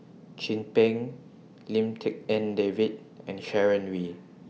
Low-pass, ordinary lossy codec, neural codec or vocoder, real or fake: none; none; none; real